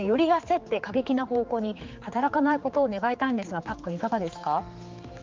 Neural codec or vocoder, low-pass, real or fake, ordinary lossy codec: codec, 16 kHz, 4 kbps, X-Codec, HuBERT features, trained on general audio; 7.2 kHz; fake; Opus, 32 kbps